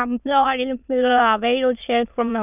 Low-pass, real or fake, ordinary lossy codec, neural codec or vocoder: 3.6 kHz; fake; none; autoencoder, 22.05 kHz, a latent of 192 numbers a frame, VITS, trained on many speakers